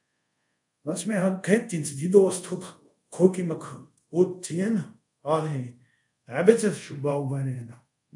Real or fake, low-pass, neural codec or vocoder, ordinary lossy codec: fake; 10.8 kHz; codec, 24 kHz, 0.5 kbps, DualCodec; MP3, 64 kbps